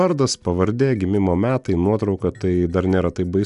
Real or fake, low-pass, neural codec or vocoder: real; 10.8 kHz; none